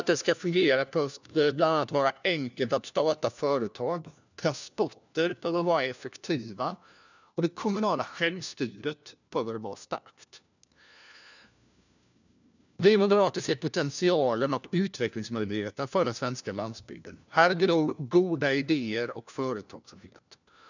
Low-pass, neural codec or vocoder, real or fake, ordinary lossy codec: 7.2 kHz; codec, 16 kHz, 1 kbps, FunCodec, trained on LibriTTS, 50 frames a second; fake; none